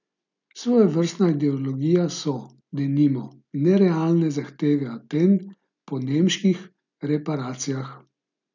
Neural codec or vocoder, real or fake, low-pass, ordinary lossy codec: none; real; 7.2 kHz; none